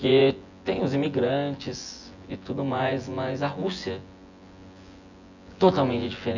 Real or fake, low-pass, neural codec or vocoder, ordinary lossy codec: fake; 7.2 kHz; vocoder, 24 kHz, 100 mel bands, Vocos; none